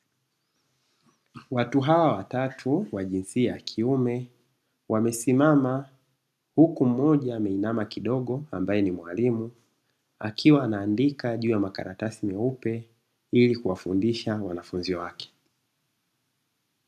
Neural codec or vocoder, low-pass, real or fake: none; 14.4 kHz; real